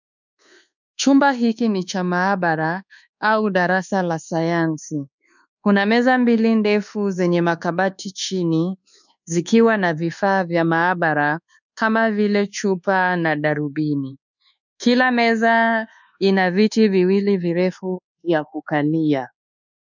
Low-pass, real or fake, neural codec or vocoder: 7.2 kHz; fake; codec, 24 kHz, 1.2 kbps, DualCodec